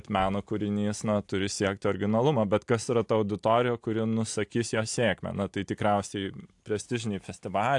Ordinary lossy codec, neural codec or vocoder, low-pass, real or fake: AAC, 64 kbps; none; 10.8 kHz; real